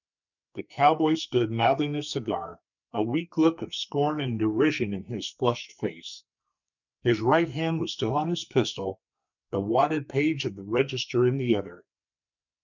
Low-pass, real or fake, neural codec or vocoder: 7.2 kHz; fake; codec, 44.1 kHz, 2.6 kbps, SNAC